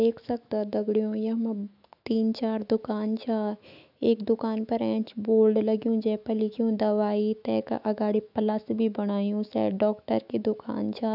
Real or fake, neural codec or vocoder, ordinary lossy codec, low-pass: fake; autoencoder, 48 kHz, 128 numbers a frame, DAC-VAE, trained on Japanese speech; none; 5.4 kHz